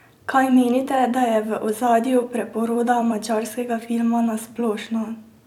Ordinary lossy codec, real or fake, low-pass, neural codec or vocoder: none; fake; 19.8 kHz; vocoder, 44.1 kHz, 128 mel bands every 256 samples, BigVGAN v2